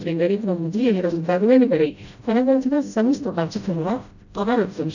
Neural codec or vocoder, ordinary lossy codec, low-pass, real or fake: codec, 16 kHz, 0.5 kbps, FreqCodec, smaller model; none; 7.2 kHz; fake